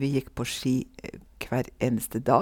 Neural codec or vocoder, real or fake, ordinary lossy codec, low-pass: none; real; none; 19.8 kHz